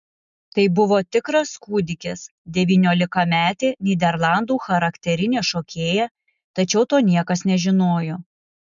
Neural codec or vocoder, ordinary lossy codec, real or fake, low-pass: none; MP3, 96 kbps; real; 7.2 kHz